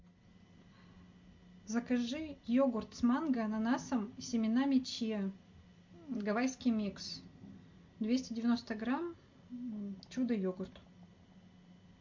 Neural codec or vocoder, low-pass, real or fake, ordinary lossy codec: none; 7.2 kHz; real; MP3, 48 kbps